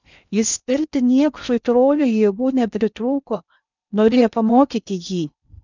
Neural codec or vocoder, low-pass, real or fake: codec, 16 kHz in and 24 kHz out, 0.6 kbps, FocalCodec, streaming, 2048 codes; 7.2 kHz; fake